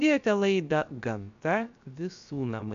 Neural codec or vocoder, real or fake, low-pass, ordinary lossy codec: codec, 16 kHz, 0.7 kbps, FocalCodec; fake; 7.2 kHz; AAC, 96 kbps